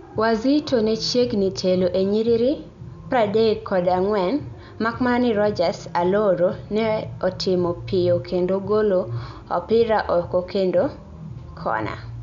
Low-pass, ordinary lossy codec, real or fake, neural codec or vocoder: 7.2 kHz; none; real; none